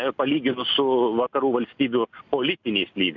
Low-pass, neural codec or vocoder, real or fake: 7.2 kHz; none; real